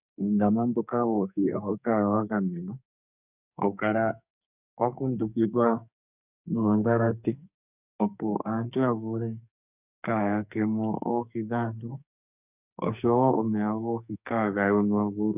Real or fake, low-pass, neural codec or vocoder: fake; 3.6 kHz; codec, 44.1 kHz, 2.6 kbps, SNAC